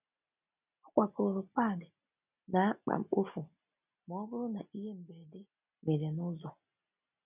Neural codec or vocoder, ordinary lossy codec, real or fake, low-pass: none; none; real; 3.6 kHz